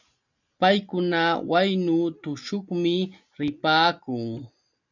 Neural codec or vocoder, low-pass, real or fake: none; 7.2 kHz; real